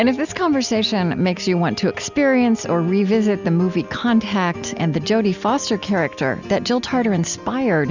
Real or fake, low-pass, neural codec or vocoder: real; 7.2 kHz; none